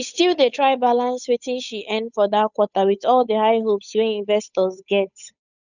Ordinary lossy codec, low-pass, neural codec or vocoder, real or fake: none; 7.2 kHz; codec, 16 kHz, 8 kbps, FunCodec, trained on Chinese and English, 25 frames a second; fake